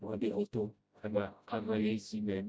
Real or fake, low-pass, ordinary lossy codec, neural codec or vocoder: fake; none; none; codec, 16 kHz, 0.5 kbps, FreqCodec, smaller model